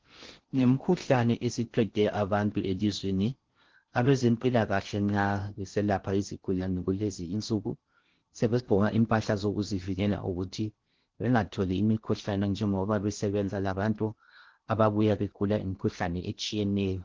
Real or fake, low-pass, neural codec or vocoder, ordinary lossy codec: fake; 7.2 kHz; codec, 16 kHz in and 24 kHz out, 0.6 kbps, FocalCodec, streaming, 4096 codes; Opus, 16 kbps